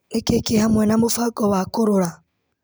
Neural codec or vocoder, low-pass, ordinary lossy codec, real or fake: none; none; none; real